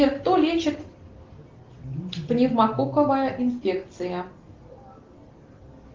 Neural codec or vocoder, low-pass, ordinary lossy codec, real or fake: none; 7.2 kHz; Opus, 16 kbps; real